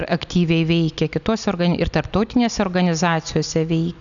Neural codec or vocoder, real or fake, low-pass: none; real; 7.2 kHz